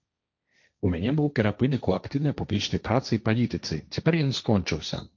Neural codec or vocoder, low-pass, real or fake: codec, 16 kHz, 1.1 kbps, Voila-Tokenizer; 7.2 kHz; fake